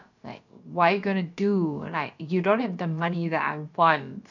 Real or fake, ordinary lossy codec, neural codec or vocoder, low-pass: fake; none; codec, 16 kHz, about 1 kbps, DyCAST, with the encoder's durations; 7.2 kHz